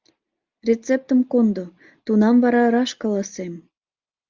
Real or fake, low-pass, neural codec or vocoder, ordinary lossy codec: real; 7.2 kHz; none; Opus, 32 kbps